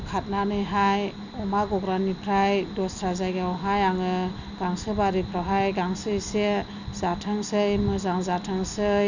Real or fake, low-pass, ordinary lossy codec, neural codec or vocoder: real; 7.2 kHz; none; none